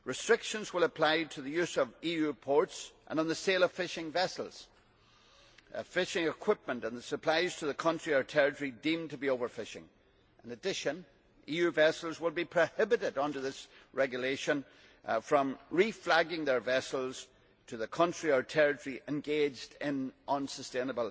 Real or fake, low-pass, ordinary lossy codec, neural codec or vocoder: real; none; none; none